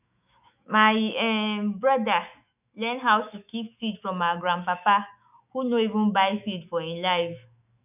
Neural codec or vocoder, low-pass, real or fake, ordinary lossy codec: autoencoder, 48 kHz, 128 numbers a frame, DAC-VAE, trained on Japanese speech; 3.6 kHz; fake; none